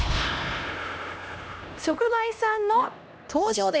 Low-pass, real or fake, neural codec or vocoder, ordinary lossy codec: none; fake; codec, 16 kHz, 1 kbps, X-Codec, HuBERT features, trained on LibriSpeech; none